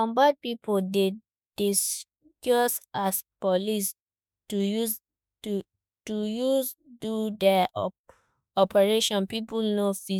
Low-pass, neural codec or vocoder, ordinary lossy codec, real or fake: none; autoencoder, 48 kHz, 32 numbers a frame, DAC-VAE, trained on Japanese speech; none; fake